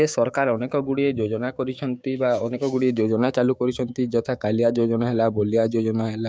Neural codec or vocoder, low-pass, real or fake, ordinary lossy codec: codec, 16 kHz, 6 kbps, DAC; none; fake; none